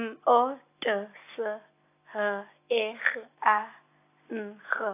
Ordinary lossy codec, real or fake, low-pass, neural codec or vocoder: AAC, 24 kbps; real; 3.6 kHz; none